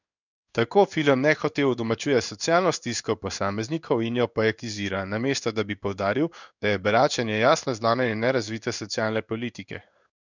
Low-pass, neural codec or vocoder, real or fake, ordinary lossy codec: 7.2 kHz; codec, 16 kHz in and 24 kHz out, 1 kbps, XY-Tokenizer; fake; none